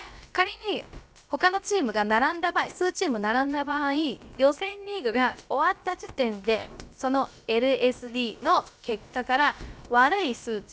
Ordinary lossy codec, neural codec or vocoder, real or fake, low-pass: none; codec, 16 kHz, about 1 kbps, DyCAST, with the encoder's durations; fake; none